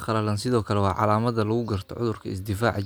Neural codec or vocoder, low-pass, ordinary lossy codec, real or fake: none; none; none; real